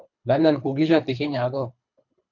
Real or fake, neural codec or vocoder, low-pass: fake; codec, 24 kHz, 3 kbps, HILCodec; 7.2 kHz